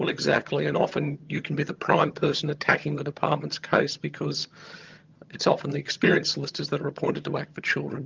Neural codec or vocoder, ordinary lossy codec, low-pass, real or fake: vocoder, 22.05 kHz, 80 mel bands, HiFi-GAN; Opus, 24 kbps; 7.2 kHz; fake